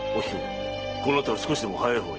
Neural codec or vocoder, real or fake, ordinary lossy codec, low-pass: none; real; Opus, 16 kbps; 7.2 kHz